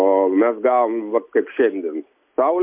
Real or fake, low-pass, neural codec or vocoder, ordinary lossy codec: real; 3.6 kHz; none; MP3, 32 kbps